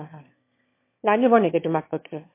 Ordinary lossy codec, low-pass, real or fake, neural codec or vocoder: MP3, 24 kbps; 3.6 kHz; fake; autoencoder, 22.05 kHz, a latent of 192 numbers a frame, VITS, trained on one speaker